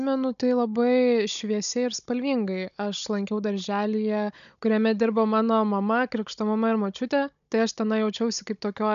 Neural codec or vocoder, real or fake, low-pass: codec, 16 kHz, 16 kbps, FunCodec, trained on Chinese and English, 50 frames a second; fake; 7.2 kHz